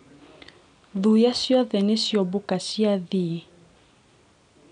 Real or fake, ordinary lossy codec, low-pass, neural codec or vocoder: real; none; 9.9 kHz; none